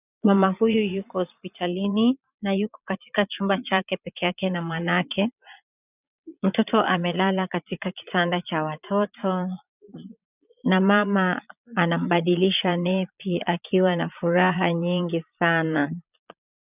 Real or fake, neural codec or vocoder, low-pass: fake; vocoder, 22.05 kHz, 80 mel bands, Vocos; 3.6 kHz